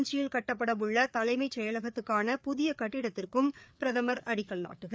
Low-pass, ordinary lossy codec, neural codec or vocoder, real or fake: none; none; codec, 16 kHz, 4 kbps, FreqCodec, larger model; fake